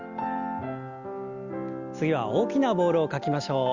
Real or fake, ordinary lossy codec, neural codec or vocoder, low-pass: real; Opus, 32 kbps; none; 7.2 kHz